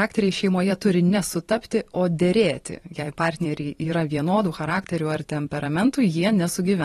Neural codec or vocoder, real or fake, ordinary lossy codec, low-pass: vocoder, 44.1 kHz, 128 mel bands every 512 samples, BigVGAN v2; fake; AAC, 32 kbps; 19.8 kHz